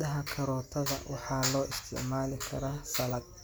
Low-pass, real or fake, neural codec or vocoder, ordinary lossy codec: none; real; none; none